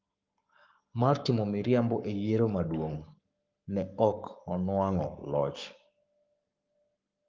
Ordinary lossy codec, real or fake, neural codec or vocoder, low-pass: Opus, 32 kbps; fake; codec, 44.1 kHz, 7.8 kbps, Pupu-Codec; 7.2 kHz